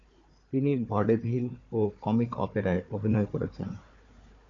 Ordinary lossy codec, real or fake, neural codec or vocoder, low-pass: MP3, 48 kbps; fake; codec, 16 kHz, 4 kbps, FunCodec, trained on Chinese and English, 50 frames a second; 7.2 kHz